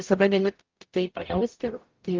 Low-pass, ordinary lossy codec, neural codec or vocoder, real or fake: 7.2 kHz; Opus, 32 kbps; codec, 44.1 kHz, 0.9 kbps, DAC; fake